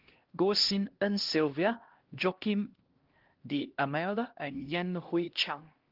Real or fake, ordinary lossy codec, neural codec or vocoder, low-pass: fake; Opus, 16 kbps; codec, 16 kHz, 1 kbps, X-Codec, HuBERT features, trained on LibriSpeech; 5.4 kHz